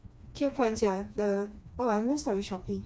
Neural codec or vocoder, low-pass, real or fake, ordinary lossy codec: codec, 16 kHz, 2 kbps, FreqCodec, smaller model; none; fake; none